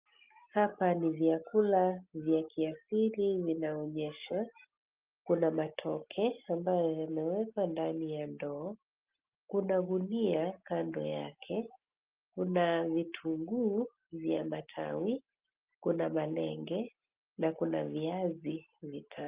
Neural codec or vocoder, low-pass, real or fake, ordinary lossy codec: none; 3.6 kHz; real; Opus, 32 kbps